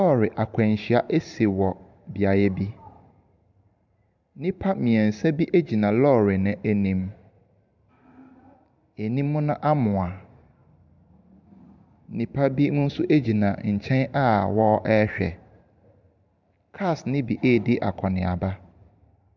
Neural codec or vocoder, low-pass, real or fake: vocoder, 44.1 kHz, 128 mel bands every 512 samples, BigVGAN v2; 7.2 kHz; fake